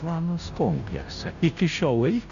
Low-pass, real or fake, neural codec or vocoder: 7.2 kHz; fake; codec, 16 kHz, 0.5 kbps, FunCodec, trained on Chinese and English, 25 frames a second